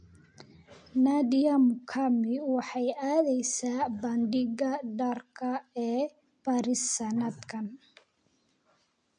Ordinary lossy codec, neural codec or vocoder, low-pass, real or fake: MP3, 48 kbps; none; 9.9 kHz; real